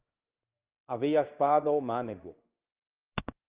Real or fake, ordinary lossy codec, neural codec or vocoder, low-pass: fake; Opus, 32 kbps; codec, 16 kHz in and 24 kHz out, 1 kbps, XY-Tokenizer; 3.6 kHz